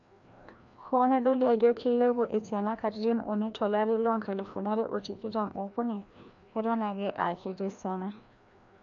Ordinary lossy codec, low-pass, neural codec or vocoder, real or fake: none; 7.2 kHz; codec, 16 kHz, 1 kbps, FreqCodec, larger model; fake